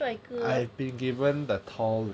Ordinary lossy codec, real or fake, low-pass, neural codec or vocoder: none; real; none; none